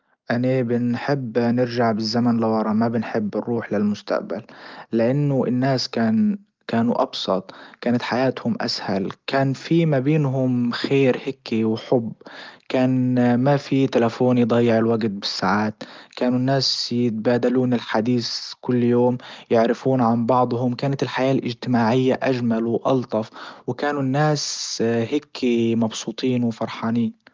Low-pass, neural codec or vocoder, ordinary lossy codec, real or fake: 7.2 kHz; none; Opus, 32 kbps; real